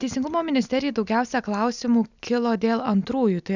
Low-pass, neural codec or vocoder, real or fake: 7.2 kHz; none; real